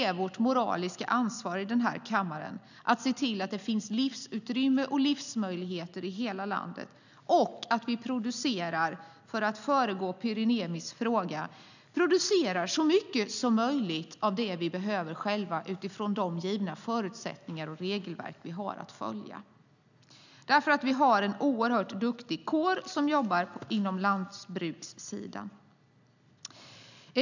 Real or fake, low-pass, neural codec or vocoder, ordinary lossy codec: real; 7.2 kHz; none; none